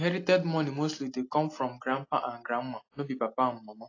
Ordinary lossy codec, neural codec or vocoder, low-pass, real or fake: AAC, 32 kbps; none; 7.2 kHz; real